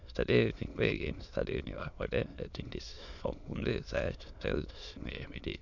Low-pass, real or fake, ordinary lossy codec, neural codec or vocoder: 7.2 kHz; fake; none; autoencoder, 22.05 kHz, a latent of 192 numbers a frame, VITS, trained on many speakers